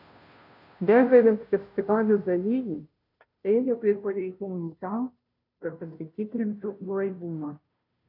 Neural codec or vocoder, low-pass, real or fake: codec, 16 kHz, 0.5 kbps, FunCodec, trained on Chinese and English, 25 frames a second; 5.4 kHz; fake